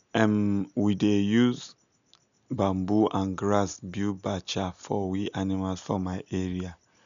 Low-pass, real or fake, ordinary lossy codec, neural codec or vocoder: 7.2 kHz; real; none; none